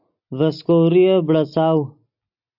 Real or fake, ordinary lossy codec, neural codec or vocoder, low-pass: real; AAC, 48 kbps; none; 5.4 kHz